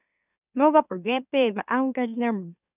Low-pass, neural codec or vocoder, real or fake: 3.6 kHz; autoencoder, 44.1 kHz, a latent of 192 numbers a frame, MeloTTS; fake